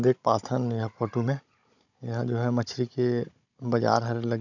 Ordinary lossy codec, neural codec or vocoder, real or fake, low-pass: none; codec, 16 kHz, 16 kbps, FunCodec, trained on Chinese and English, 50 frames a second; fake; 7.2 kHz